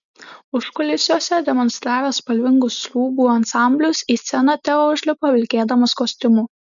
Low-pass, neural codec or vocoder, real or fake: 7.2 kHz; none; real